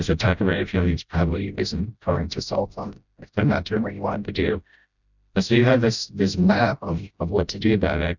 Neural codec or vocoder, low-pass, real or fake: codec, 16 kHz, 0.5 kbps, FreqCodec, smaller model; 7.2 kHz; fake